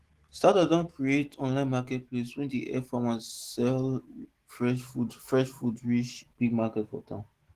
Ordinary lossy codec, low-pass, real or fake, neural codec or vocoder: Opus, 16 kbps; 14.4 kHz; fake; autoencoder, 48 kHz, 128 numbers a frame, DAC-VAE, trained on Japanese speech